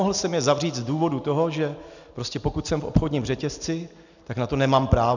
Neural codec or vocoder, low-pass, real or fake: none; 7.2 kHz; real